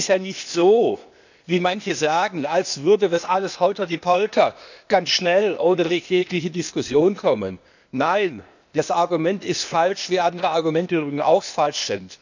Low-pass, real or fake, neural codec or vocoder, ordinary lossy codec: 7.2 kHz; fake; codec, 16 kHz, 0.8 kbps, ZipCodec; none